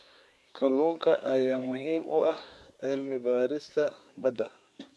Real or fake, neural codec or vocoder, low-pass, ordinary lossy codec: fake; codec, 24 kHz, 1 kbps, SNAC; none; none